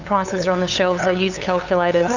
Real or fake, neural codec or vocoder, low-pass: fake; codec, 16 kHz, 8 kbps, FunCodec, trained on LibriTTS, 25 frames a second; 7.2 kHz